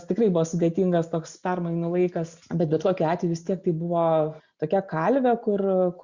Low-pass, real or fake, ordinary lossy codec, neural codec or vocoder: 7.2 kHz; real; Opus, 64 kbps; none